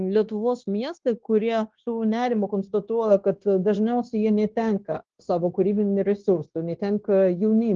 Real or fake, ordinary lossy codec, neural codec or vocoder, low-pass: fake; Opus, 16 kbps; codec, 16 kHz, 0.9 kbps, LongCat-Audio-Codec; 7.2 kHz